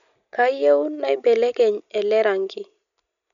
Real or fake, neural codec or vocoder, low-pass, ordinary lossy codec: real; none; 7.2 kHz; none